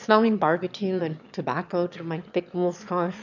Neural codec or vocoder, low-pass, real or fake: autoencoder, 22.05 kHz, a latent of 192 numbers a frame, VITS, trained on one speaker; 7.2 kHz; fake